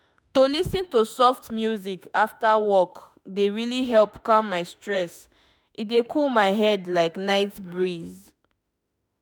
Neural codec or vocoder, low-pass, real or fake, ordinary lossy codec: autoencoder, 48 kHz, 32 numbers a frame, DAC-VAE, trained on Japanese speech; none; fake; none